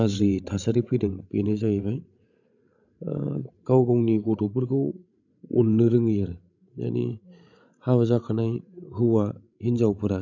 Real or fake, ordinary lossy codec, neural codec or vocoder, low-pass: fake; none; codec, 16 kHz, 16 kbps, FreqCodec, larger model; 7.2 kHz